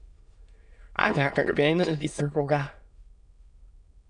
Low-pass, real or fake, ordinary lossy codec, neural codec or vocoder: 9.9 kHz; fake; MP3, 96 kbps; autoencoder, 22.05 kHz, a latent of 192 numbers a frame, VITS, trained on many speakers